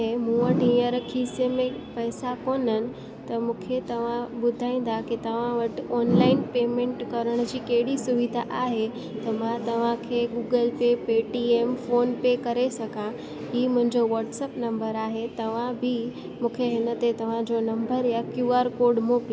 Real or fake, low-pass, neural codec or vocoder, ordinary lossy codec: real; none; none; none